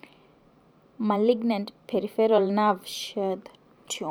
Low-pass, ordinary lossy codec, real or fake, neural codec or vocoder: none; none; fake; vocoder, 44.1 kHz, 128 mel bands every 512 samples, BigVGAN v2